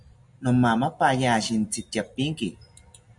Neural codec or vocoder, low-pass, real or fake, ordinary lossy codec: none; 10.8 kHz; real; MP3, 64 kbps